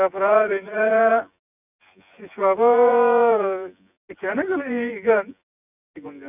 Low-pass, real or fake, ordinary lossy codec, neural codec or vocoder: 3.6 kHz; fake; none; vocoder, 24 kHz, 100 mel bands, Vocos